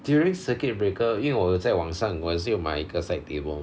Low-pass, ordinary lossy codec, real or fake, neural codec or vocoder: none; none; real; none